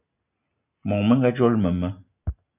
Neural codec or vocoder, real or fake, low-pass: none; real; 3.6 kHz